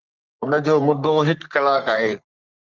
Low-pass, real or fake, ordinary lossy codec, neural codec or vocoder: 7.2 kHz; fake; Opus, 32 kbps; codec, 44.1 kHz, 3.4 kbps, Pupu-Codec